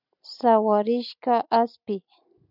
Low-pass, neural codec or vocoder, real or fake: 5.4 kHz; none; real